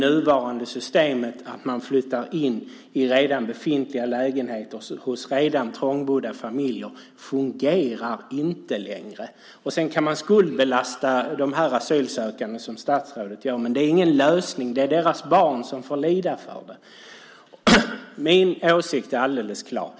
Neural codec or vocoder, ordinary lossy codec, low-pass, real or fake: none; none; none; real